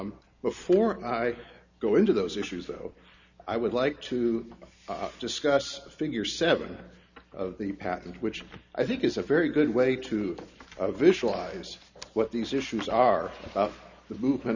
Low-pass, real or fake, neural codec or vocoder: 7.2 kHz; real; none